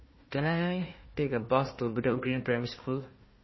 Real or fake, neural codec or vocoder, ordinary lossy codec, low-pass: fake; codec, 16 kHz, 1 kbps, FunCodec, trained on Chinese and English, 50 frames a second; MP3, 24 kbps; 7.2 kHz